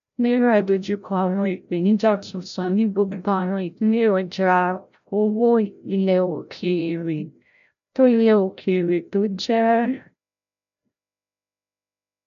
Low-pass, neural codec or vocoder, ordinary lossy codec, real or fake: 7.2 kHz; codec, 16 kHz, 0.5 kbps, FreqCodec, larger model; none; fake